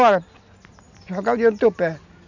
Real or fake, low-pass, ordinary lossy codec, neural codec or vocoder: real; 7.2 kHz; none; none